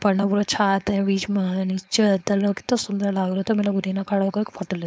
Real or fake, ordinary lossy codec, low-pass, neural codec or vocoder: fake; none; none; codec, 16 kHz, 4.8 kbps, FACodec